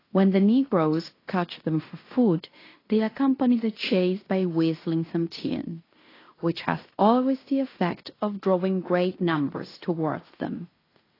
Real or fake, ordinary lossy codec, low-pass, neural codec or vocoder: fake; AAC, 24 kbps; 5.4 kHz; codec, 16 kHz in and 24 kHz out, 0.9 kbps, LongCat-Audio-Codec, fine tuned four codebook decoder